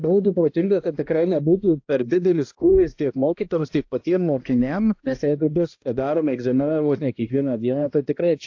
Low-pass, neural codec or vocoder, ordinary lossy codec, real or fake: 7.2 kHz; codec, 16 kHz, 1 kbps, X-Codec, HuBERT features, trained on balanced general audio; AAC, 48 kbps; fake